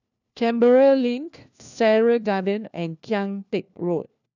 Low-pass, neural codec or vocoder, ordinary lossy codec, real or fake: 7.2 kHz; codec, 16 kHz, 1 kbps, FunCodec, trained on LibriTTS, 50 frames a second; none; fake